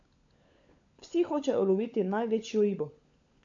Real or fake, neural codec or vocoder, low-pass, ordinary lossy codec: fake; codec, 16 kHz, 16 kbps, FunCodec, trained on LibriTTS, 50 frames a second; 7.2 kHz; AAC, 48 kbps